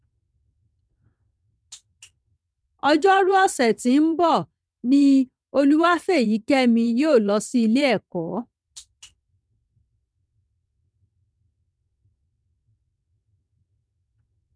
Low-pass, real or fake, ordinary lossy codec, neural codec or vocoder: none; fake; none; vocoder, 22.05 kHz, 80 mel bands, WaveNeXt